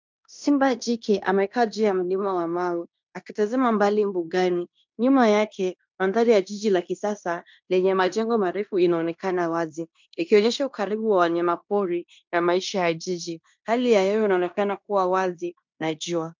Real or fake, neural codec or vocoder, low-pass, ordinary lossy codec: fake; codec, 16 kHz in and 24 kHz out, 0.9 kbps, LongCat-Audio-Codec, fine tuned four codebook decoder; 7.2 kHz; MP3, 64 kbps